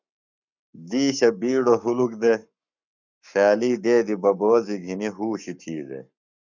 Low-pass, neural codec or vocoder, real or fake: 7.2 kHz; codec, 44.1 kHz, 7.8 kbps, Pupu-Codec; fake